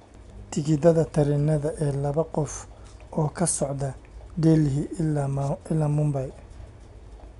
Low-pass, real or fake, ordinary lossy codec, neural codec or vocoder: 10.8 kHz; real; none; none